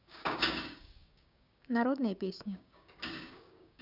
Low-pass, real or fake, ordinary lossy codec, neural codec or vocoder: 5.4 kHz; fake; none; codec, 16 kHz, 6 kbps, DAC